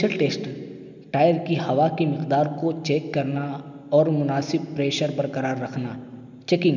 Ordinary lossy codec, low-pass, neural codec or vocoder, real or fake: none; 7.2 kHz; none; real